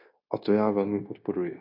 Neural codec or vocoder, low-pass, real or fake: codec, 16 kHz, 0.9 kbps, LongCat-Audio-Codec; 5.4 kHz; fake